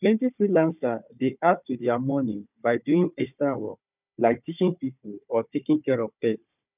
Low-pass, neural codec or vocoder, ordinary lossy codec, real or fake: 3.6 kHz; codec, 16 kHz, 4 kbps, FunCodec, trained on Chinese and English, 50 frames a second; none; fake